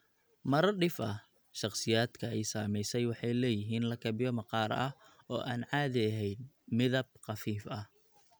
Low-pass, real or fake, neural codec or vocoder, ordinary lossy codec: none; real; none; none